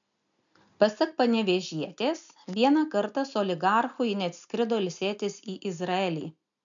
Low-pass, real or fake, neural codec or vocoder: 7.2 kHz; real; none